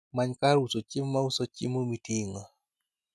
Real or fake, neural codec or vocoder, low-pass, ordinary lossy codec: real; none; none; none